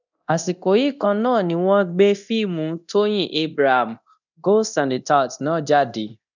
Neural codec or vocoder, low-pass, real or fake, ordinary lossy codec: codec, 24 kHz, 0.9 kbps, DualCodec; 7.2 kHz; fake; none